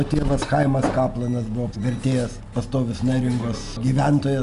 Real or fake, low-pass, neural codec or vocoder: real; 10.8 kHz; none